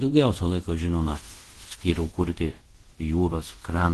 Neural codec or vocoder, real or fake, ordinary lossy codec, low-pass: codec, 24 kHz, 0.5 kbps, DualCodec; fake; Opus, 16 kbps; 10.8 kHz